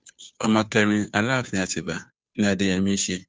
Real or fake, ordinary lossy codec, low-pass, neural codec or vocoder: fake; none; none; codec, 16 kHz, 2 kbps, FunCodec, trained on Chinese and English, 25 frames a second